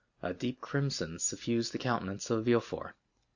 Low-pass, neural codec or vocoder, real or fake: 7.2 kHz; none; real